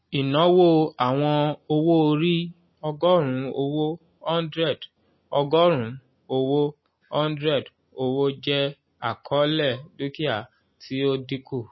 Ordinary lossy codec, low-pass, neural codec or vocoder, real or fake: MP3, 24 kbps; 7.2 kHz; none; real